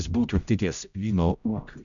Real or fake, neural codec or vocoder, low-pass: fake; codec, 16 kHz, 0.5 kbps, X-Codec, HuBERT features, trained on general audio; 7.2 kHz